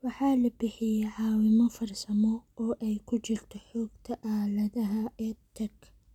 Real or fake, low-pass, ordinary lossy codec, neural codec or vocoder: real; 19.8 kHz; none; none